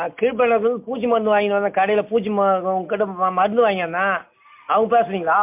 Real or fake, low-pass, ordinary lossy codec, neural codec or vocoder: real; 3.6 kHz; MP3, 32 kbps; none